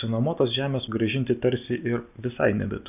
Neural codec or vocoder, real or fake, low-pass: none; real; 3.6 kHz